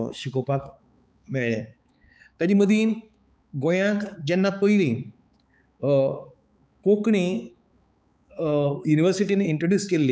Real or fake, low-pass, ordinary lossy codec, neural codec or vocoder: fake; none; none; codec, 16 kHz, 4 kbps, X-Codec, HuBERT features, trained on balanced general audio